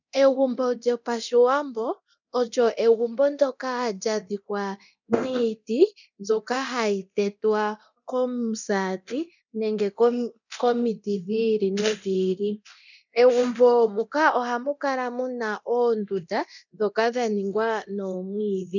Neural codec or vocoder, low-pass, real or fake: codec, 24 kHz, 0.9 kbps, DualCodec; 7.2 kHz; fake